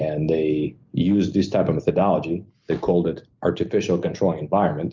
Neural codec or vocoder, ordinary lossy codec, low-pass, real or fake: none; Opus, 24 kbps; 7.2 kHz; real